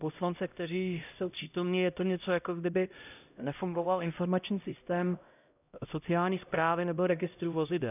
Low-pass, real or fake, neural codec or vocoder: 3.6 kHz; fake; codec, 16 kHz, 0.5 kbps, X-Codec, HuBERT features, trained on LibriSpeech